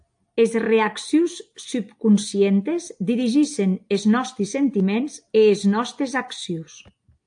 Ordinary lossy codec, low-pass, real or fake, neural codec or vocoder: AAC, 64 kbps; 9.9 kHz; real; none